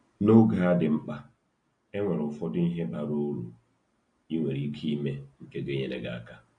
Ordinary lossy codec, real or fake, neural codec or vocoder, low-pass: AAC, 48 kbps; real; none; 9.9 kHz